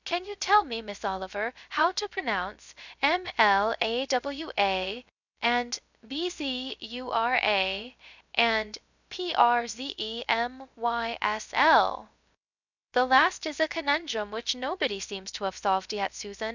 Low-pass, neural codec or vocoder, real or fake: 7.2 kHz; codec, 16 kHz, 0.3 kbps, FocalCodec; fake